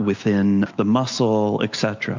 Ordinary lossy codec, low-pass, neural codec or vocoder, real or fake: MP3, 64 kbps; 7.2 kHz; none; real